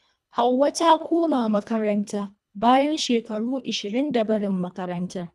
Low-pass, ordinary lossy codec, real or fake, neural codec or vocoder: none; none; fake; codec, 24 kHz, 1.5 kbps, HILCodec